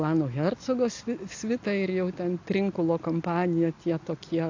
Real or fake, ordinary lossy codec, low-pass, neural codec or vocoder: real; AAC, 48 kbps; 7.2 kHz; none